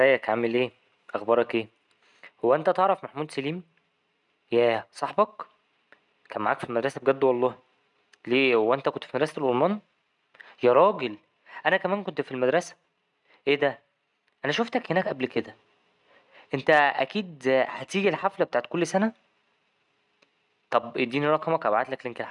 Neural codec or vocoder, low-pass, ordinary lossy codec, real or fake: none; 10.8 kHz; none; real